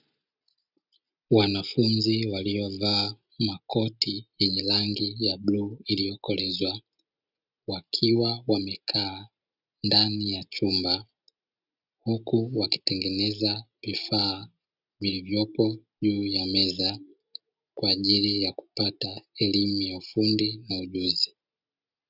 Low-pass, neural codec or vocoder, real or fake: 5.4 kHz; none; real